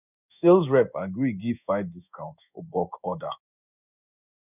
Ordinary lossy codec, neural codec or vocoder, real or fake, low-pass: none; codec, 16 kHz in and 24 kHz out, 1 kbps, XY-Tokenizer; fake; 3.6 kHz